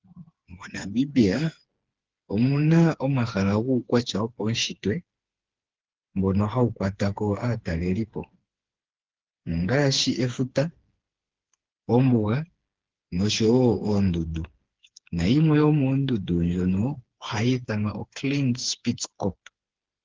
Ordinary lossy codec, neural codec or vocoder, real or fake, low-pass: Opus, 16 kbps; codec, 16 kHz, 4 kbps, FreqCodec, smaller model; fake; 7.2 kHz